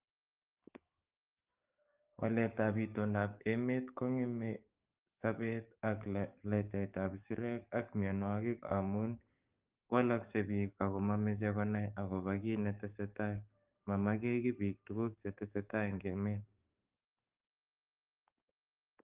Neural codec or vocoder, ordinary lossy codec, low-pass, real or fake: codec, 44.1 kHz, 7.8 kbps, DAC; Opus, 24 kbps; 3.6 kHz; fake